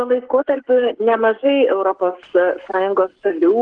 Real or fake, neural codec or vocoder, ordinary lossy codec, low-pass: fake; codec, 44.1 kHz, 7.8 kbps, Pupu-Codec; Opus, 16 kbps; 9.9 kHz